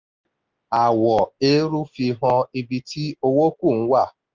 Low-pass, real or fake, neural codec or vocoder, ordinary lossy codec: 7.2 kHz; real; none; Opus, 32 kbps